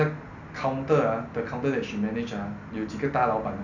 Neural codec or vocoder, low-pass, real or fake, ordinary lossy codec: none; 7.2 kHz; real; none